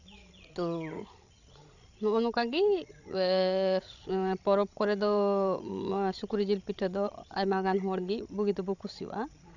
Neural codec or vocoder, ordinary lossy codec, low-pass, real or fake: codec, 16 kHz, 16 kbps, FreqCodec, larger model; none; 7.2 kHz; fake